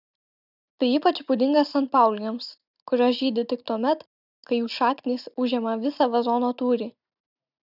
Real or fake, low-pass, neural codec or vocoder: real; 5.4 kHz; none